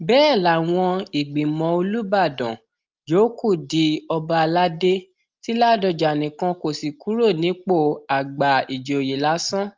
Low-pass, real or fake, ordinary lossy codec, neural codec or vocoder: 7.2 kHz; real; Opus, 32 kbps; none